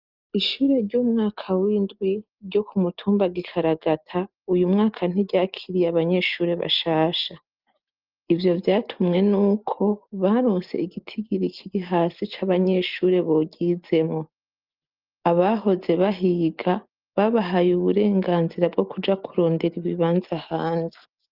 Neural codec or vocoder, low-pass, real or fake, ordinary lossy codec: none; 5.4 kHz; real; Opus, 32 kbps